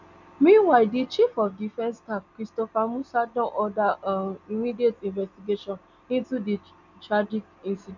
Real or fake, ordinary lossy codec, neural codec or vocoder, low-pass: real; none; none; 7.2 kHz